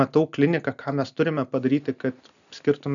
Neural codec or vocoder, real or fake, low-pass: none; real; 7.2 kHz